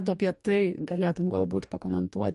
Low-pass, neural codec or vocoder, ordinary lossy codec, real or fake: 14.4 kHz; codec, 44.1 kHz, 2.6 kbps, DAC; MP3, 48 kbps; fake